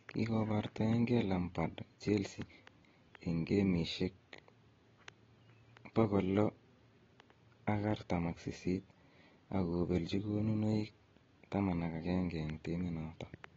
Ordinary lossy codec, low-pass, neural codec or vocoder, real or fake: AAC, 24 kbps; 7.2 kHz; none; real